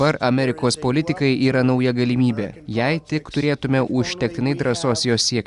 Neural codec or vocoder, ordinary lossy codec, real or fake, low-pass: none; Opus, 64 kbps; real; 10.8 kHz